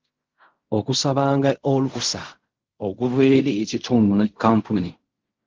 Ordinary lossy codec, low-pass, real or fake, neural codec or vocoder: Opus, 24 kbps; 7.2 kHz; fake; codec, 16 kHz in and 24 kHz out, 0.4 kbps, LongCat-Audio-Codec, fine tuned four codebook decoder